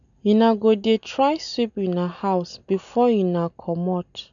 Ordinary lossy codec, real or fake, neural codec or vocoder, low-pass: none; real; none; 7.2 kHz